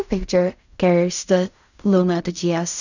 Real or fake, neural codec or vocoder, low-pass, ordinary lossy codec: fake; codec, 16 kHz in and 24 kHz out, 0.4 kbps, LongCat-Audio-Codec, fine tuned four codebook decoder; 7.2 kHz; none